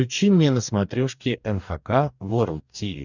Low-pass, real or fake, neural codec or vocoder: 7.2 kHz; fake; codec, 44.1 kHz, 2.6 kbps, DAC